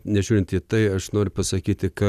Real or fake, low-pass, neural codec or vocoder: real; 14.4 kHz; none